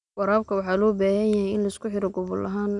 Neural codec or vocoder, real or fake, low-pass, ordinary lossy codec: none; real; 10.8 kHz; none